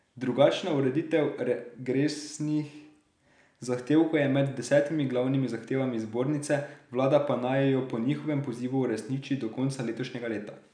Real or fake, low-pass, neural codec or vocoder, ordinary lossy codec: real; 9.9 kHz; none; none